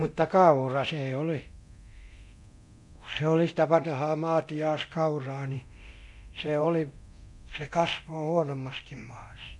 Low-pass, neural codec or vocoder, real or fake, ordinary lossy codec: 10.8 kHz; codec, 24 kHz, 0.9 kbps, DualCodec; fake; none